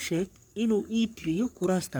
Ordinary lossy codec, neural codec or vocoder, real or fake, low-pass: none; codec, 44.1 kHz, 3.4 kbps, Pupu-Codec; fake; none